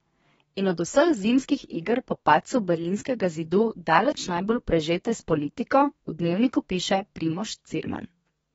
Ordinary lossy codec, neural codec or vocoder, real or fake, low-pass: AAC, 24 kbps; codec, 32 kHz, 1.9 kbps, SNAC; fake; 14.4 kHz